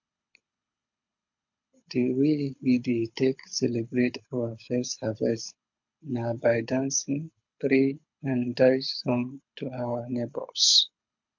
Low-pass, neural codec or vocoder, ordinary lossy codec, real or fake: 7.2 kHz; codec, 24 kHz, 6 kbps, HILCodec; MP3, 48 kbps; fake